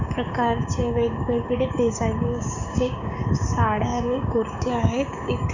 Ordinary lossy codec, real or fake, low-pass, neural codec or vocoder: none; fake; 7.2 kHz; codec, 16 kHz, 6 kbps, DAC